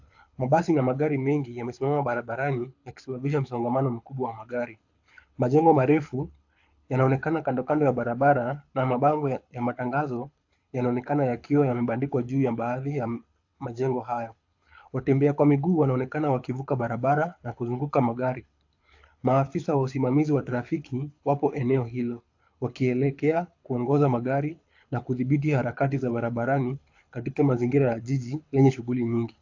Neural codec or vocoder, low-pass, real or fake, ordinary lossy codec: codec, 24 kHz, 6 kbps, HILCodec; 7.2 kHz; fake; AAC, 48 kbps